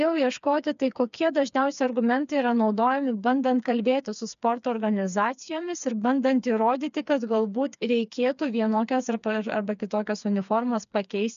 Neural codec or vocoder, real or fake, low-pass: codec, 16 kHz, 4 kbps, FreqCodec, smaller model; fake; 7.2 kHz